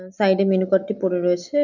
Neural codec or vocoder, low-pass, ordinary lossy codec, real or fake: none; 7.2 kHz; none; real